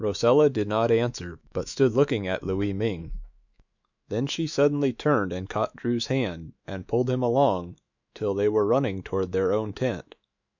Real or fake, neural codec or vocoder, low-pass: fake; autoencoder, 48 kHz, 128 numbers a frame, DAC-VAE, trained on Japanese speech; 7.2 kHz